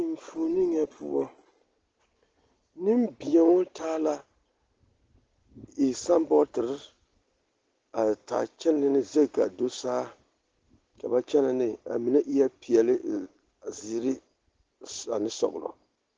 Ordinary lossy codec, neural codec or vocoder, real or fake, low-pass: Opus, 16 kbps; none; real; 7.2 kHz